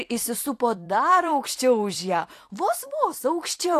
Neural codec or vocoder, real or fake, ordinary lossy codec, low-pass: vocoder, 44.1 kHz, 128 mel bands every 256 samples, BigVGAN v2; fake; AAC, 64 kbps; 14.4 kHz